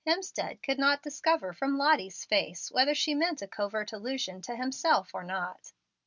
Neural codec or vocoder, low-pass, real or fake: none; 7.2 kHz; real